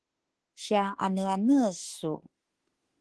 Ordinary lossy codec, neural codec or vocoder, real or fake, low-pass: Opus, 16 kbps; autoencoder, 48 kHz, 32 numbers a frame, DAC-VAE, trained on Japanese speech; fake; 10.8 kHz